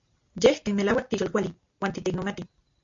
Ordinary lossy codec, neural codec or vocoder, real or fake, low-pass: MP3, 48 kbps; none; real; 7.2 kHz